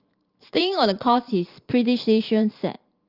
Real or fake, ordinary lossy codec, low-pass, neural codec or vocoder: fake; Opus, 24 kbps; 5.4 kHz; vocoder, 44.1 kHz, 128 mel bands every 512 samples, BigVGAN v2